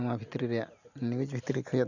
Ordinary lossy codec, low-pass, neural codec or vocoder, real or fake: none; 7.2 kHz; none; real